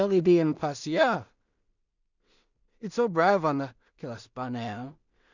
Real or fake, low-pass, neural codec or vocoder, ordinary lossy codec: fake; 7.2 kHz; codec, 16 kHz in and 24 kHz out, 0.4 kbps, LongCat-Audio-Codec, two codebook decoder; none